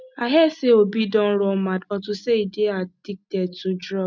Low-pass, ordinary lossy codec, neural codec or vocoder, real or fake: 7.2 kHz; none; none; real